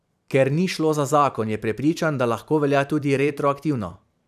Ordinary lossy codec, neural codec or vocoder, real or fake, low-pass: none; vocoder, 44.1 kHz, 128 mel bands every 512 samples, BigVGAN v2; fake; 14.4 kHz